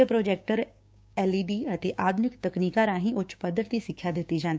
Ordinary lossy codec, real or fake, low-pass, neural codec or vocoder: none; fake; none; codec, 16 kHz, 6 kbps, DAC